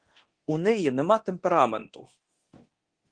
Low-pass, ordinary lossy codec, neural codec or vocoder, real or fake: 9.9 kHz; Opus, 16 kbps; codec, 24 kHz, 0.9 kbps, DualCodec; fake